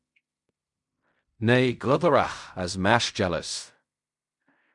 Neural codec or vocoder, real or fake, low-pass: codec, 16 kHz in and 24 kHz out, 0.4 kbps, LongCat-Audio-Codec, fine tuned four codebook decoder; fake; 10.8 kHz